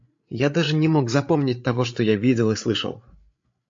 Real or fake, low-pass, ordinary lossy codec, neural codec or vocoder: fake; 7.2 kHz; AAC, 64 kbps; codec, 16 kHz, 8 kbps, FreqCodec, larger model